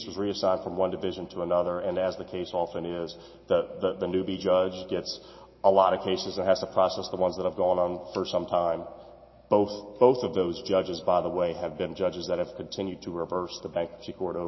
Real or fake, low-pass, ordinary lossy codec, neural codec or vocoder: real; 7.2 kHz; MP3, 24 kbps; none